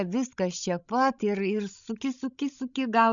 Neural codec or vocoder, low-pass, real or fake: codec, 16 kHz, 16 kbps, FreqCodec, larger model; 7.2 kHz; fake